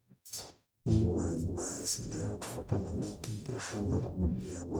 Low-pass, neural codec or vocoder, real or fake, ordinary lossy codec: none; codec, 44.1 kHz, 0.9 kbps, DAC; fake; none